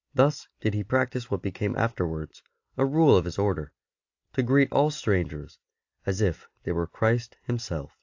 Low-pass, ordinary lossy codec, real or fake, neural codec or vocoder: 7.2 kHz; AAC, 48 kbps; real; none